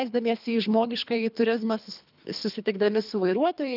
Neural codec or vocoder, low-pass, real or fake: codec, 24 kHz, 3 kbps, HILCodec; 5.4 kHz; fake